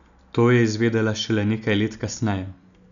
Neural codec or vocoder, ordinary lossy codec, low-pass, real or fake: none; none; 7.2 kHz; real